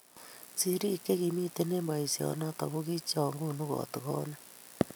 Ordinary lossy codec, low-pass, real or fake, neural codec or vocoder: none; none; real; none